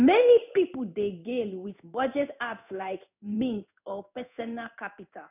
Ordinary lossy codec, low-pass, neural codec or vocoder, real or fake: none; 3.6 kHz; codec, 16 kHz in and 24 kHz out, 1 kbps, XY-Tokenizer; fake